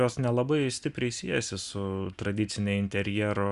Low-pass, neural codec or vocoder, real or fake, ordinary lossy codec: 10.8 kHz; none; real; Opus, 64 kbps